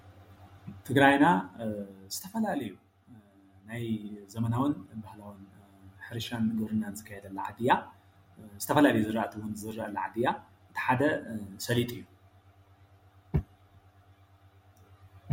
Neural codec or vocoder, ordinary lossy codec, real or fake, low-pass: none; MP3, 64 kbps; real; 19.8 kHz